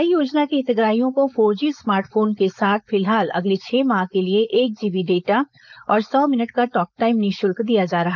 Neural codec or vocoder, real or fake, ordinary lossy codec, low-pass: codec, 16 kHz, 4.8 kbps, FACodec; fake; none; 7.2 kHz